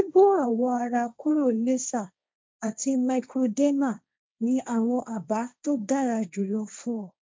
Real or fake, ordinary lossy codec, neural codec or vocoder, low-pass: fake; none; codec, 16 kHz, 1.1 kbps, Voila-Tokenizer; none